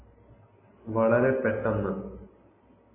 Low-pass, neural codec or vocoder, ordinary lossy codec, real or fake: 3.6 kHz; none; MP3, 16 kbps; real